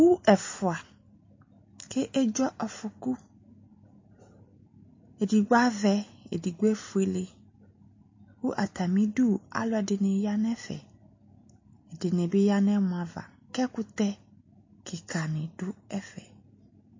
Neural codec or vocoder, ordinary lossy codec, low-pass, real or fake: none; MP3, 32 kbps; 7.2 kHz; real